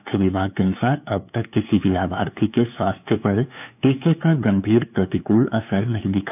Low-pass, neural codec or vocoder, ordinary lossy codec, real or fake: 3.6 kHz; codec, 16 kHz, 2 kbps, FunCodec, trained on LibriTTS, 25 frames a second; none; fake